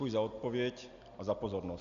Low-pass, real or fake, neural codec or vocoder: 7.2 kHz; real; none